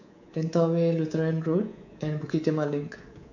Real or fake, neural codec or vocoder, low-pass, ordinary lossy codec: fake; codec, 24 kHz, 3.1 kbps, DualCodec; 7.2 kHz; none